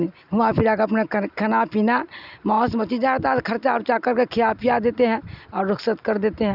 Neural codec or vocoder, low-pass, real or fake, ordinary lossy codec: none; 5.4 kHz; real; none